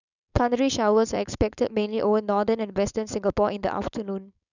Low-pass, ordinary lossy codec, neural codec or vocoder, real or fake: 7.2 kHz; none; codec, 16 kHz, 4.8 kbps, FACodec; fake